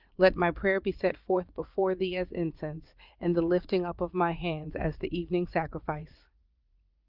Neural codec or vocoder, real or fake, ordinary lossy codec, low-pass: vocoder, 44.1 kHz, 80 mel bands, Vocos; fake; Opus, 32 kbps; 5.4 kHz